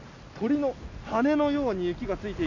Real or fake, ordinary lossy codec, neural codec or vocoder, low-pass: real; none; none; 7.2 kHz